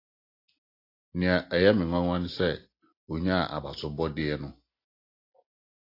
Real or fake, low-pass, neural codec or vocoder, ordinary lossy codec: real; 5.4 kHz; none; AAC, 32 kbps